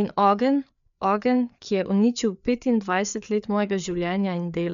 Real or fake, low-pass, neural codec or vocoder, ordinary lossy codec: fake; 7.2 kHz; codec, 16 kHz, 4 kbps, FreqCodec, larger model; none